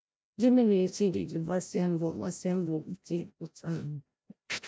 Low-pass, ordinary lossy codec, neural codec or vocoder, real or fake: none; none; codec, 16 kHz, 0.5 kbps, FreqCodec, larger model; fake